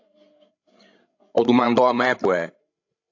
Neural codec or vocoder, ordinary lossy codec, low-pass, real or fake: codec, 16 kHz, 16 kbps, FreqCodec, larger model; AAC, 48 kbps; 7.2 kHz; fake